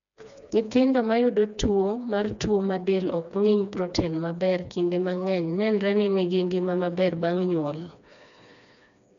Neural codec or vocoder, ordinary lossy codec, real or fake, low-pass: codec, 16 kHz, 2 kbps, FreqCodec, smaller model; MP3, 96 kbps; fake; 7.2 kHz